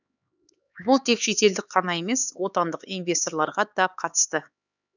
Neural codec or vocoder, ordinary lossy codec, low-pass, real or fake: codec, 16 kHz, 4 kbps, X-Codec, HuBERT features, trained on LibriSpeech; none; 7.2 kHz; fake